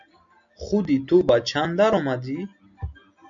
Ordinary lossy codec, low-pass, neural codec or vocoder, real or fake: MP3, 64 kbps; 7.2 kHz; none; real